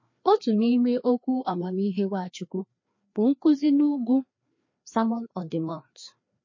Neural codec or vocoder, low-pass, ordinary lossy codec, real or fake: codec, 16 kHz, 2 kbps, FreqCodec, larger model; 7.2 kHz; MP3, 32 kbps; fake